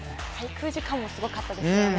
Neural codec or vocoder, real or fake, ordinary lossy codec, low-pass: none; real; none; none